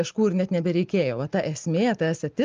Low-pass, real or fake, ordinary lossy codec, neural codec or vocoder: 7.2 kHz; real; Opus, 24 kbps; none